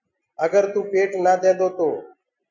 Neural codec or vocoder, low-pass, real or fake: none; 7.2 kHz; real